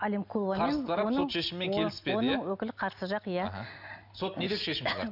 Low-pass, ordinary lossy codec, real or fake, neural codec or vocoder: 5.4 kHz; none; real; none